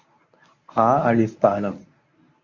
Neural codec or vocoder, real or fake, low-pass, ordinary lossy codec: codec, 24 kHz, 0.9 kbps, WavTokenizer, medium speech release version 1; fake; 7.2 kHz; Opus, 64 kbps